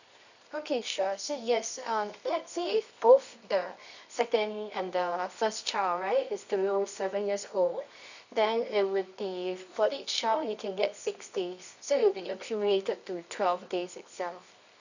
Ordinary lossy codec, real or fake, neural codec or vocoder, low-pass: none; fake; codec, 24 kHz, 0.9 kbps, WavTokenizer, medium music audio release; 7.2 kHz